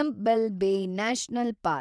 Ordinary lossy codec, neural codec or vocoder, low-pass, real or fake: none; vocoder, 22.05 kHz, 80 mel bands, WaveNeXt; none; fake